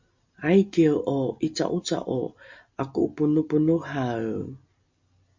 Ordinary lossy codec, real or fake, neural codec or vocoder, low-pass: MP3, 48 kbps; real; none; 7.2 kHz